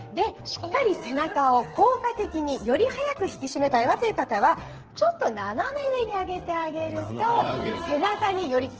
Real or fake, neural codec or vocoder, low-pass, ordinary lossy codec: fake; codec, 16 kHz, 8 kbps, FreqCodec, smaller model; 7.2 kHz; Opus, 16 kbps